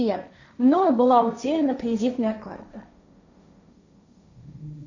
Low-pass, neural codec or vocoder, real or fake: 7.2 kHz; codec, 16 kHz, 1.1 kbps, Voila-Tokenizer; fake